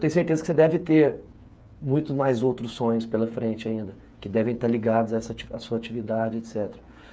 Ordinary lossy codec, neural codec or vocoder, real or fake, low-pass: none; codec, 16 kHz, 8 kbps, FreqCodec, smaller model; fake; none